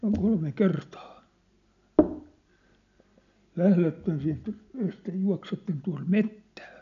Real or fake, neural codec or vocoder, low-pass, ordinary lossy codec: real; none; 7.2 kHz; none